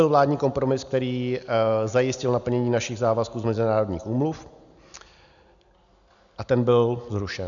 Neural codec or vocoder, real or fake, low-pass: none; real; 7.2 kHz